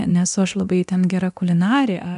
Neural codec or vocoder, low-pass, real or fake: codec, 24 kHz, 0.9 kbps, DualCodec; 10.8 kHz; fake